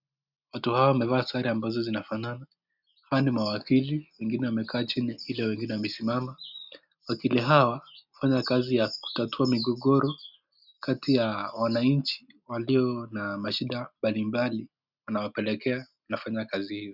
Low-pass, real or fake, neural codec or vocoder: 5.4 kHz; real; none